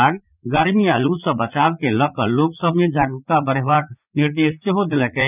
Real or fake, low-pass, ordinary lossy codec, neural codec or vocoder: fake; 3.6 kHz; none; vocoder, 44.1 kHz, 80 mel bands, Vocos